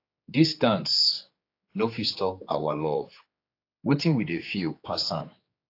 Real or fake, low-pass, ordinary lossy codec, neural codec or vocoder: fake; 5.4 kHz; AAC, 32 kbps; codec, 16 kHz, 4 kbps, X-Codec, HuBERT features, trained on general audio